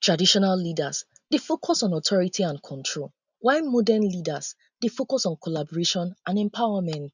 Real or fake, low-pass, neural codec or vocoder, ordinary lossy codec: real; 7.2 kHz; none; none